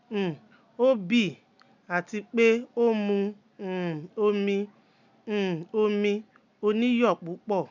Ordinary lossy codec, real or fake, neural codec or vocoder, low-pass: none; real; none; 7.2 kHz